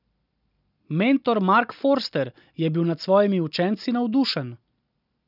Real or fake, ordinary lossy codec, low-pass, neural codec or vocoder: real; none; 5.4 kHz; none